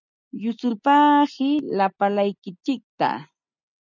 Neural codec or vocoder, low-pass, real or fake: none; 7.2 kHz; real